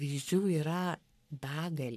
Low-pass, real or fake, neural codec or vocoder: 14.4 kHz; fake; codec, 44.1 kHz, 3.4 kbps, Pupu-Codec